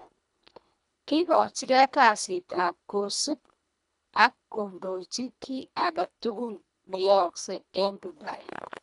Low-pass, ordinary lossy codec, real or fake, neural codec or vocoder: 10.8 kHz; none; fake; codec, 24 kHz, 1.5 kbps, HILCodec